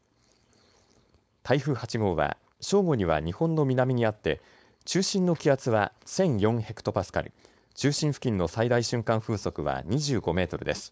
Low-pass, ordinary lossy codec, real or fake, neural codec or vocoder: none; none; fake; codec, 16 kHz, 4.8 kbps, FACodec